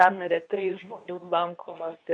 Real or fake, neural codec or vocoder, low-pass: fake; codec, 24 kHz, 0.9 kbps, WavTokenizer, medium speech release version 2; 9.9 kHz